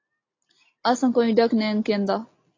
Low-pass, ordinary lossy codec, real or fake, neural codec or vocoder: 7.2 kHz; AAC, 32 kbps; real; none